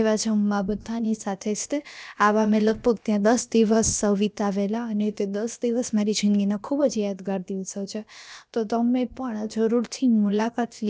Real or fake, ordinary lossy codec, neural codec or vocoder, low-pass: fake; none; codec, 16 kHz, about 1 kbps, DyCAST, with the encoder's durations; none